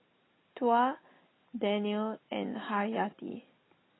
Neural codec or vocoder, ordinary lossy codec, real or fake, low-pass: none; AAC, 16 kbps; real; 7.2 kHz